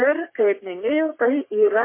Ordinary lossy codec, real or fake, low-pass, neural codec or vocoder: MP3, 24 kbps; fake; 3.6 kHz; codec, 44.1 kHz, 3.4 kbps, Pupu-Codec